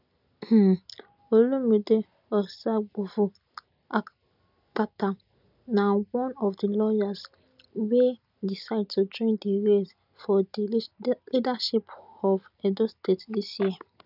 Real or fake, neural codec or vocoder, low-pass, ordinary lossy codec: real; none; 5.4 kHz; none